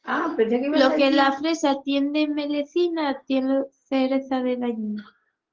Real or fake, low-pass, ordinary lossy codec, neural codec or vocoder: real; 7.2 kHz; Opus, 16 kbps; none